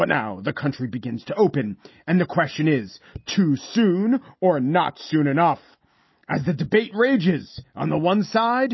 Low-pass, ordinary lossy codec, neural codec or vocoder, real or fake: 7.2 kHz; MP3, 24 kbps; none; real